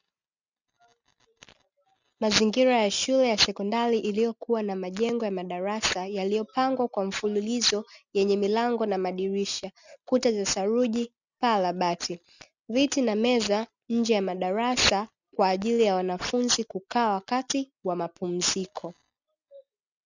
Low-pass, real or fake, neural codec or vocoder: 7.2 kHz; real; none